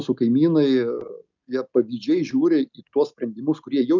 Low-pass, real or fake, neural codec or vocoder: 7.2 kHz; real; none